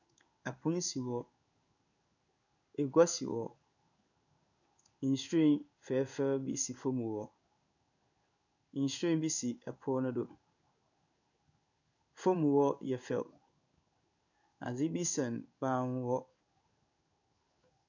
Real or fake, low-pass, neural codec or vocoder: fake; 7.2 kHz; codec, 16 kHz in and 24 kHz out, 1 kbps, XY-Tokenizer